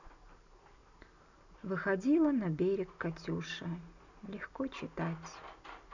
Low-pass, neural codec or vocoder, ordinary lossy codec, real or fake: 7.2 kHz; none; none; real